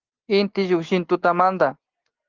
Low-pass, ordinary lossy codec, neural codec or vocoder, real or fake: 7.2 kHz; Opus, 16 kbps; none; real